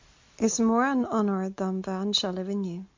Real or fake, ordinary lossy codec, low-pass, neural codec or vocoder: real; MP3, 48 kbps; 7.2 kHz; none